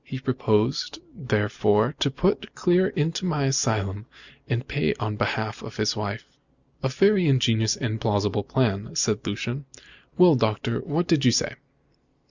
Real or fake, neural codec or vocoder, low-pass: real; none; 7.2 kHz